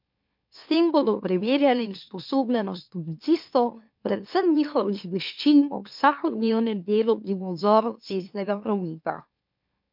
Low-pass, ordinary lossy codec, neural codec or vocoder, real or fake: 5.4 kHz; MP3, 48 kbps; autoencoder, 44.1 kHz, a latent of 192 numbers a frame, MeloTTS; fake